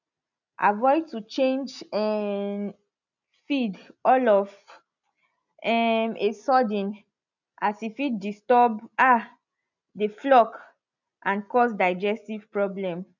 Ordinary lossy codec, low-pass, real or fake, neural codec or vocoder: none; 7.2 kHz; real; none